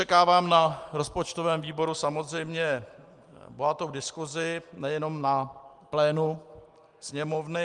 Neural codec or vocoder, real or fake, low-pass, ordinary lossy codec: none; real; 10.8 kHz; Opus, 32 kbps